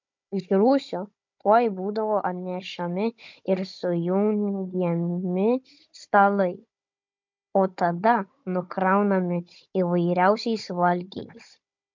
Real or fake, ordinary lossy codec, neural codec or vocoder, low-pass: fake; MP3, 64 kbps; codec, 16 kHz, 16 kbps, FunCodec, trained on Chinese and English, 50 frames a second; 7.2 kHz